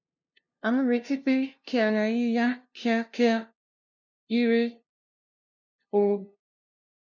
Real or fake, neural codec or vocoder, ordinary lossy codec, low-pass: fake; codec, 16 kHz, 0.5 kbps, FunCodec, trained on LibriTTS, 25 frames a second; none; 7.2 kHz